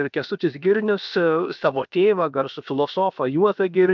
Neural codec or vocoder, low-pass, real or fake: codec, 16 kHz, about 1 kbps, DyCAST, with the encoder's durations; 7.2 kHz; fake